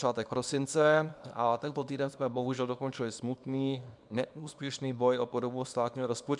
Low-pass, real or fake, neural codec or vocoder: 10.8 kHz; fake; codec, 24 kHz, 0.9 kbps, WavTokenizer, small release